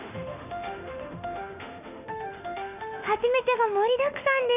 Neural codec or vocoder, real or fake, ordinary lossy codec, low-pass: autoencoder, 48 kHz, 32 numbers a frame, DAC-VAE, trained on Japanese speech; fake; none; 3.6 kHz